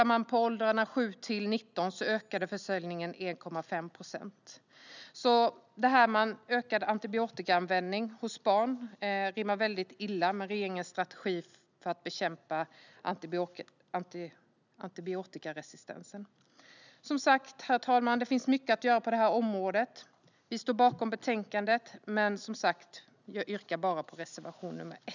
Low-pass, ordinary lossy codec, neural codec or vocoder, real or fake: 7.2 kHz; none; none; real